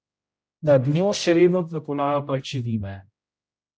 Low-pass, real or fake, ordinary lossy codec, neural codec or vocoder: none; fake; none; codec, 16 kHz, 0.5 kbps, X-Codec, HuBERT features, trained on general audio